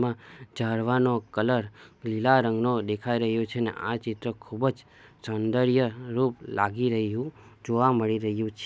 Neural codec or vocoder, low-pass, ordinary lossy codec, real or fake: none; none; none; real